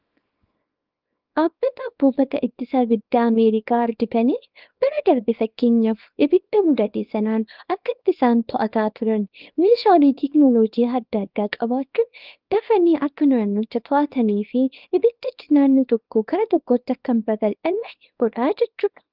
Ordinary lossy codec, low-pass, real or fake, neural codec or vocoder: Opus, 24 kbps; 5.4 kHz; fake; codec, 24 kHz, 0.9 kbps, WavTokenizer, small release